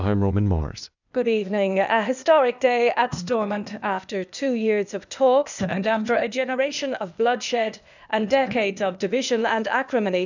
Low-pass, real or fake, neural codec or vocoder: 7.2 kHz; fake; codec, 16 kHz, 0.8 kbps, ZipCodec